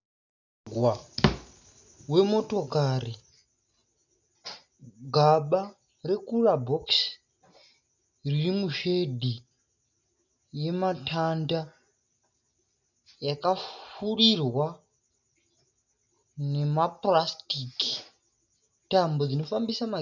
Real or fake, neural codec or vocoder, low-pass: real; none; 7.2 kHz